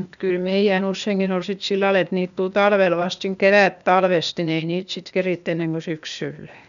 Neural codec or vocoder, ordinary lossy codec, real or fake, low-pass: codec, 16 kHz, 0.8 kbps, ZipCodec; none; fake; 7.2 kHz